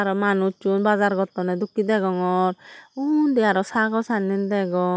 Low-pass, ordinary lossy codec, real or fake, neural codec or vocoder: none; none; real; none